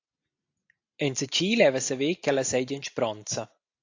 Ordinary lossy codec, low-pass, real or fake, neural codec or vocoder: AAC, 48 kbps; 7.2 kHz; real; none